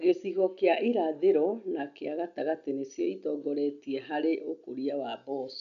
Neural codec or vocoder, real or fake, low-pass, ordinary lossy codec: none; real; 7.2 kHz; none